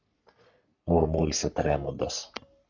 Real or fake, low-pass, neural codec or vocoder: fake; 7.2 kHz; codec, 44.1 kHz, 3.4 kbps, Pupu-Codec